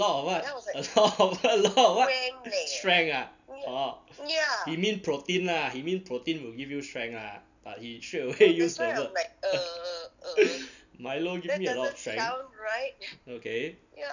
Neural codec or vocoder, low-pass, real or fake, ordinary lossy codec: none; 7.2 kHz; real; none